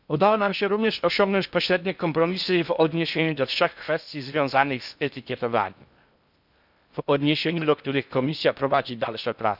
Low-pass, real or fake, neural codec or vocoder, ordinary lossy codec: 5.4 kHz; fake; codec, 16 kHz in and 24 kHz out, 0.6 kbps, FocalCodec, streaming, 2048 codes; none